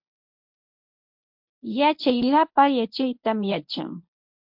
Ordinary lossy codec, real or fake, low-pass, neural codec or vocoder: MP3, 48 kbps; fake; 5.4 kHz; codec, 24 kHz, 0.9 kbps, WavTokenizer, medium speech release version 1